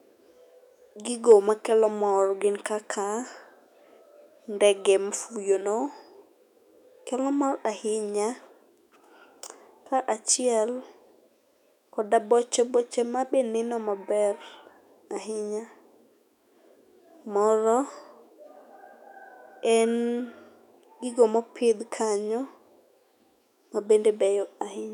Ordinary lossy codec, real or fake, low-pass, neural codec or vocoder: none; fake; 19.8 kHz; autoencoder, 48 kHz, 128 numbers a frame, DAC-VAE, trained on Japanese speech